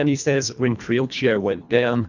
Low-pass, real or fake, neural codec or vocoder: 7.2 kHz; fake; codec, 24 kHz, 1.5 kbps, HILCodec